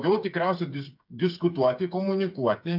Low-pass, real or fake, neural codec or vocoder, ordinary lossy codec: 5.4 kHz; fake; codec, 16 kHz, 8 kbps, FreqCodec, smaller model; MP3, 48 kbps